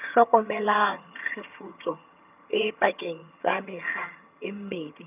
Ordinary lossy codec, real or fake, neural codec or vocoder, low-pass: none; fake; vocoder, 22.05 kHz, 80 mel bands, HiFi-GAN; 3.6 kHz